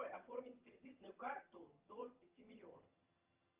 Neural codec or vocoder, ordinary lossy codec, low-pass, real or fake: vocoder, 22.05 kHz, 80 mel bands, HiFi-GAN; Opus, 24 kbps; 3.6 kHz; fake